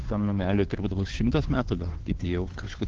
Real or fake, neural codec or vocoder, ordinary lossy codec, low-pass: fake; codec, 16 kHz, 2 kbps, FunCodec, trained on Chinese and English, 25 frames a second; Opus, 16 kbps; 7.2 kHz